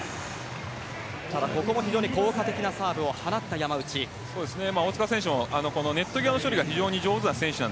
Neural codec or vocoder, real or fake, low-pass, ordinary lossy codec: none; real; none; none